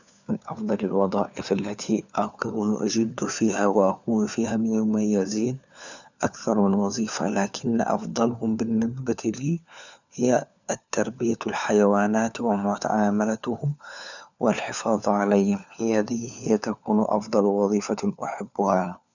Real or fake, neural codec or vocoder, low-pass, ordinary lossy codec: fake; codec, 16 kHz, 4 kbps, FunCodec, trained on LibriTTS, 50 frames a second; 7.2 kHz; none